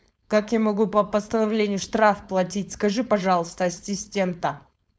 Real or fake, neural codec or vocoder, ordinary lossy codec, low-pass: fake; codec, 16 kHz, 4.8 kbps, FACodec; none; none